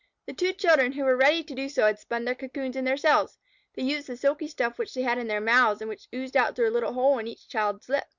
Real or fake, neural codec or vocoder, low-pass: real; none; 7.2 kHz